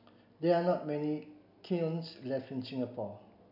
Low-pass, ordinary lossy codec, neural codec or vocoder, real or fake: 5.4 kHz; none; none; real